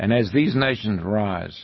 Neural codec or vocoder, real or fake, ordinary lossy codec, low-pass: none; real; MP3, 24 kbps; 7.2 kHz